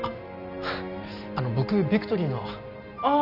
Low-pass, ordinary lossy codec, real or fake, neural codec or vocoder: 5.4 kHz; none; real; none